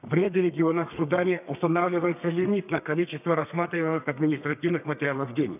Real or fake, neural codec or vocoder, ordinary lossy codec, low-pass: fake; codec, 32 kHz, 1.9 kbps, SNAC; none; 3.6 kHz